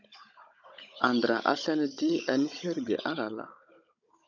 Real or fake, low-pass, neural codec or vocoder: fake; 7.2 kHz; codec, 16 kHz, 16 kbps, FunCodec, trained on Chinese and English, 50 frames a second